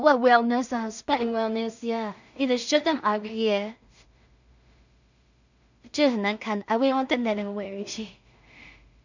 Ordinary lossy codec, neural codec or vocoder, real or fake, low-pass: none; codec, 16 kHz in and 24 kHz out, 0.4 kbps, LongCat-Audio-Codec, two codebook decoder; fake; 7.2 kHz